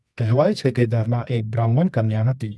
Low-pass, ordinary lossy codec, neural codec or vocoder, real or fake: none; none; codec, 24 kHz, 0.9 kbps, WavTokenizer, medium music audio release; fake